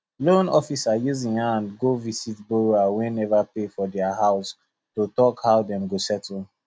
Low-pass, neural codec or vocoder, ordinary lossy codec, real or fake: none; none; none; real